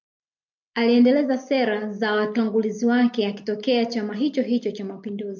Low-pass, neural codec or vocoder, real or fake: 7.2 kHz; none; real